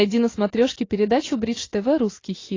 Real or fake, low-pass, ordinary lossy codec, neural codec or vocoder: real; 7.2 kHz; AAC, 32 kbps; none